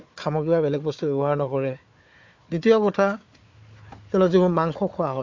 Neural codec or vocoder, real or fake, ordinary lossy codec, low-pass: codec, 16 kHz, 4 kbps, FunCodec, trained on Chinese and English, 50 frames a second; fake; MP3, 48 kbps; 7.2 kHz